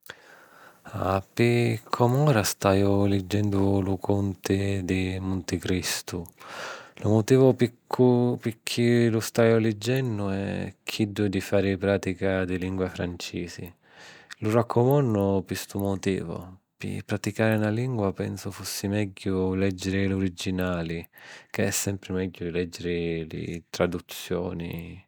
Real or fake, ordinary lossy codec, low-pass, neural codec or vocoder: real; none; none; none